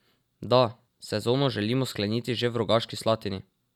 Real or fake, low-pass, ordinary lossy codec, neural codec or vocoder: real; 19.8 kHz; none; none